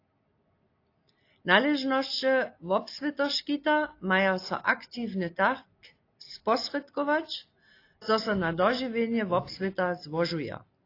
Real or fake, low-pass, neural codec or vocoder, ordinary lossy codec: real; 5.4 kHz; none; AAC, 32 kbps